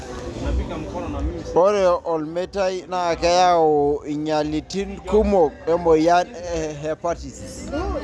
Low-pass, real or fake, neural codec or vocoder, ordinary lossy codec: none; real; none; none